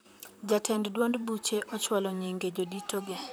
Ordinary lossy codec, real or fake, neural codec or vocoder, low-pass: none; fake; vocoder, 44.1 kHz, 128 mel bands, Pupu-Vocoder; none